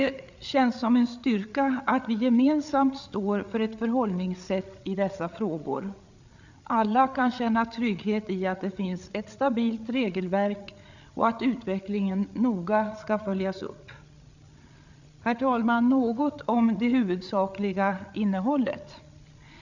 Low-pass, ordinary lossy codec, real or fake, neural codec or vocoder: 7.2 kHz; none; fake; codec, 16 kHz, 8 kbps, FreqCodec, larger model